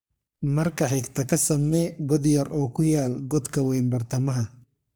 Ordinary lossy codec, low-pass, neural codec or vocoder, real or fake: none; none; codec, 44.1 kHz, 3.4 kbps, Pupu-Codec; fake